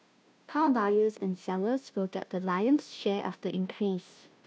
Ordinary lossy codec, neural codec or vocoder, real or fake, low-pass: none; codec, 16 kHz, 0.5 kbps, FunCodec, trained on Chinese and English, 25 frames a second; fake; none